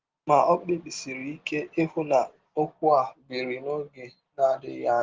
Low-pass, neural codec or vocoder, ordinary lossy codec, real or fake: 7.2 kHz; none; Opus, 16 kbps; real